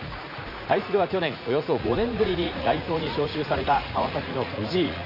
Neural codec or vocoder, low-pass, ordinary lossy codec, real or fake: vocoder, 44.1 kHz, 80 mel bands, Vocos; 5.4 kHz; none; fake